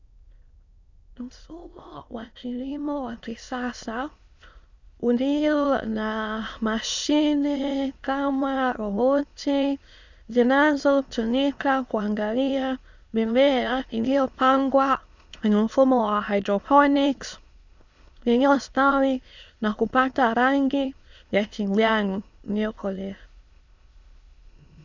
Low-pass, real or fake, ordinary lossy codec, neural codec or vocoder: 7.2 kHz; fake; Opus, 64 kbps; autoencoder, 22.05 kHz, a latent of 192 numbers a frame, VITS, trained on many speakers